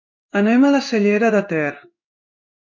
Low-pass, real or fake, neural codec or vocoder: 7.2 kHz; fake; codec, 16 kHz in and 24 kHz out, 1 kbps, XY-Tokenizer